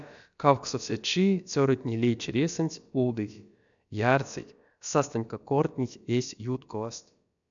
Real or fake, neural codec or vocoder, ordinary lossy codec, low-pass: fake; codec, 16 kHz, about 1 kbps, DyCAST, with the encoder's durations; MP3, 96 kbps; 7.2 kHz